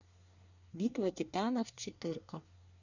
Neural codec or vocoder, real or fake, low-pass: codec, 24 kHz, 1 kbps, SNAC; fake; 7.2 kHz